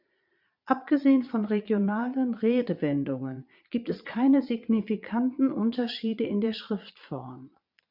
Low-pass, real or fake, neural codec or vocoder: 5.4 kHz; fake; vocoder, 22.05 kHz, 80 mel bands, Vocos